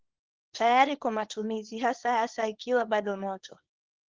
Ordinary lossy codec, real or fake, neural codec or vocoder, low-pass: Opus, 16 kbps; fake; codec, 24 kHz, 0.9 kbps, WavTokenizer, small release; 7.2 kHz